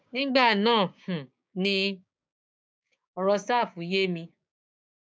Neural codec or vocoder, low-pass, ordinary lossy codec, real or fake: codec, 16 kHz, 6 kbps, DAC; none; none; fake